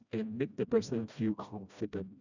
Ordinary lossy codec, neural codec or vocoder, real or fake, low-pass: none; codec, 16 kHz, 1 kbps, FreqCodec, smaller model; fake; 7.2 kHz